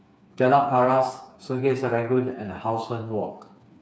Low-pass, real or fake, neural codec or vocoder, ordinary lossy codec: none; fake; codec, 16 kHz, 4 kbps, FreqCodec, smaller model; none